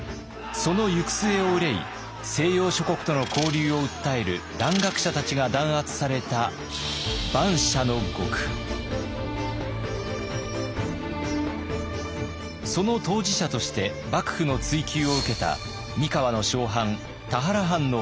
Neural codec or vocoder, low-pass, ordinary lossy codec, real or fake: none; none; none; real